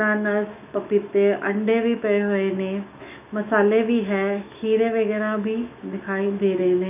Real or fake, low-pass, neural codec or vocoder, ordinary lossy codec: fake; 3.6 kHz; autoencoder, 48 kHz, 128 numbers a frame, DAC-VAE, trained on Japanese speech; none